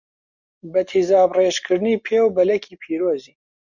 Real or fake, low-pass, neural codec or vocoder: real; 7.2 kHz; none